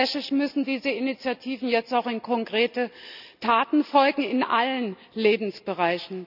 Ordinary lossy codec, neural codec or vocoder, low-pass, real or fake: none; none; 5.4 kHz; real